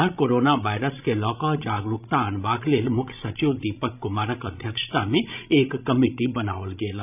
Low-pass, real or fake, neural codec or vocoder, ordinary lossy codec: 3.6 kHz; fake; codec, 16 kHz, 16 kbps, FreqCodec, larger model; none